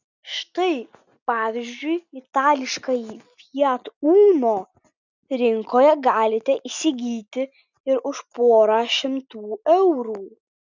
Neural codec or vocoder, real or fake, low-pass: none; real; 7.2 kHz